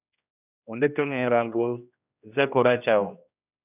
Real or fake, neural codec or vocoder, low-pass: fake; codec, 16 kHz, 1 kbps, X-Codec, HuBERT features, trained on general audio; 3.6 kHz